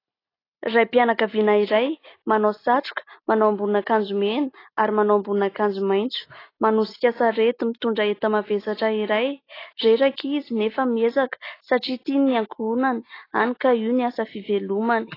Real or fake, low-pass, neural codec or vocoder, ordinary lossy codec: real; 5.4 kHz; none; AAC, 24 kbps